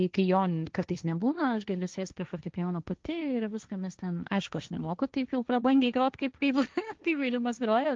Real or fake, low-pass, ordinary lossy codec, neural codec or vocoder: fake; 7.2 kHz; Opus, 24 kbps; codec, 16 kHz, 1.1 kbps, Voila-Tokenizer